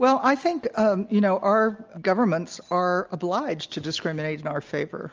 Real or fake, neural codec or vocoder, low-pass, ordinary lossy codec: real; none; 7.2 kHz; Opus, 24 kbps